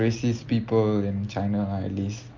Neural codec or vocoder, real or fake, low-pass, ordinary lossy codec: none; real; 7.2 kHz; Opus, 24 kbps